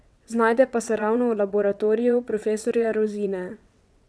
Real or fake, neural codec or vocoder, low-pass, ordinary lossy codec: fake; vocoder, 22.05 kHz, 80 mel bands, WaveNeXt; none; none